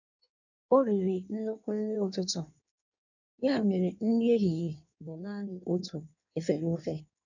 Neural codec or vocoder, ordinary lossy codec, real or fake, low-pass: codec, 16 kHz in and 24 kHz out, 1.1 kbps, FireRedTTS-2 codec; none; fake; 7.2 kHz